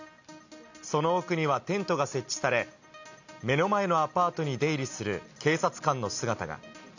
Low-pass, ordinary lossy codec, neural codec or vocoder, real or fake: 7.2 kHz; none; none; real